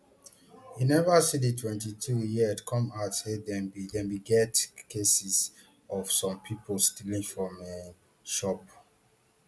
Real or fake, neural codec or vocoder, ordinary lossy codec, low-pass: real; none; none; none